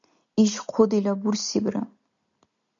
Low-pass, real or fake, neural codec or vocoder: 7.2 kHz; real; none